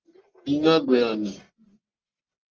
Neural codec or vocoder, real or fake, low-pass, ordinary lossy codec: codec, 44.1 kHz, 1.7 kbps, Pupu-Codec; fake; 7.2 kHz; Opus, 24 kbps